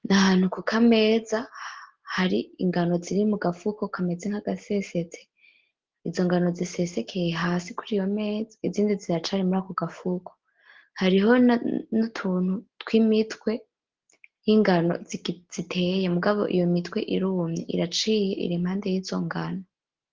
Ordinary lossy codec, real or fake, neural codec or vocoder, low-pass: Opus, 16 kbps; real; none; 7.2 kHz